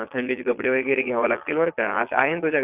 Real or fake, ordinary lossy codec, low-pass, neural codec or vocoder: fake; AAC, 32 kbps; 3.6 kHz; vocoder, 22.05 kHz, 80 mel bands, Vocos